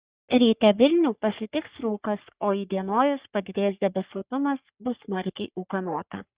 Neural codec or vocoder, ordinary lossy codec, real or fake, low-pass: codec, 44.1 kHz, 3.4 kbps, Pupu-Codec; Opus, 64 kbps; fake; 3.6 kHz